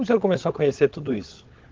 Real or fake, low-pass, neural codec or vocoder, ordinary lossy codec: fake; 7.2 kHz; codec, 16 kHz, 16 kbps, FunCodec, trained on LibriTTS, 50 frames a second; Opus, 16 kbps